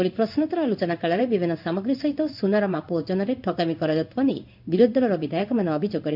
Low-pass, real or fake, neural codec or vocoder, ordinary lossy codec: 5.4 kHz; fake; codec, 16 kHz in and 24 kHz out, 1 kbps, XY-Tokenizer; none